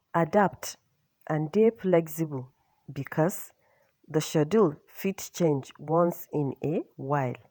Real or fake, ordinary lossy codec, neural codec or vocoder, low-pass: fake; none; vocoder, 48 kHz, 128 mel bands, Vocos; none